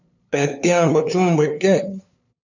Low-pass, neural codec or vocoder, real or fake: 7.2 kHz; codec, 16 kHz, 2 kbps, FunCodec, trained on LibriTTS, 25 frames a second; fake